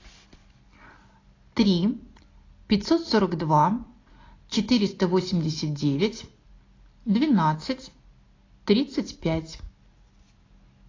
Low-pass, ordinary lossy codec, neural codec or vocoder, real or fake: 7.2 kHz; AAC, 32 kbps; none; real